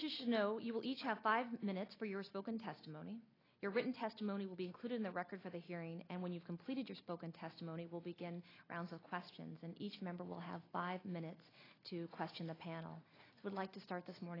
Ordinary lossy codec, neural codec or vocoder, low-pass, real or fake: AAC, 24 kbps; none; 5.4 kHz; real